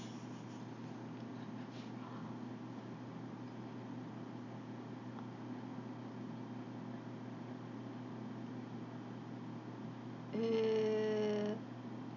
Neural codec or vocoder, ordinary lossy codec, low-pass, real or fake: none; none; 7.2 kHz; real